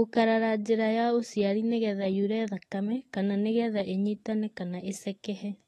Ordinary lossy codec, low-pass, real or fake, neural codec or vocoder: AAC, 32 kbps; 19.8 kHz; fake; autoencoder, 48 kHz, 128 numbers a frame, DAC-VAE, trained on Japanese speech